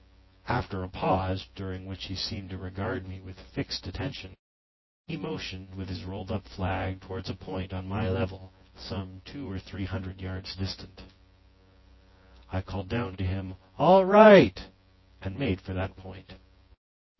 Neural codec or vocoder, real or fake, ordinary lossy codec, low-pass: vocoder, 24 kHz, 100 mel bands, Vocos; fake; MP3, 24 kbps; 7.2 kHz